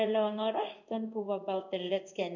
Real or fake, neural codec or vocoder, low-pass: fake; codec, 16 kHz in and 24 kHz out, 1 kbps, XY-Tokenizer; 7.2 kHz